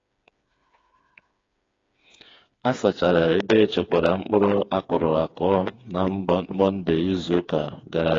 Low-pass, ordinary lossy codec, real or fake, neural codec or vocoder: 7.2 kHz; AAC, 32 kbps; fake; codec, 16 kHz, 4 kbps, FreqCodec, smaller model